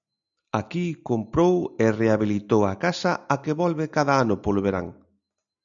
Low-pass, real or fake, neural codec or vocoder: 7.2 kHz; real; none